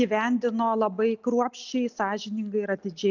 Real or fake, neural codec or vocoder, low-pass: real; none; 7.2 kHz